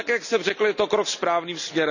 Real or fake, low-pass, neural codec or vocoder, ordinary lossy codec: real; 7.2 kHz; none; none